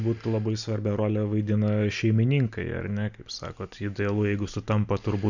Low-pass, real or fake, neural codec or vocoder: 7.2 kHz; real; none